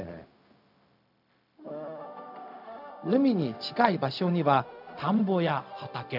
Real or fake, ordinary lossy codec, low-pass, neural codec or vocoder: fake; none; 5.4 kHz; codec, 16 kHz, 0.4 kbps, LongCat-Audio-Codec